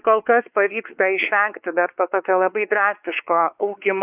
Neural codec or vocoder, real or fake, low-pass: codec, 16 kHz, 4 kbps, X-Codec, WavLM features, trained on Multilingual LibriSpeech; fake; 3.6 kHz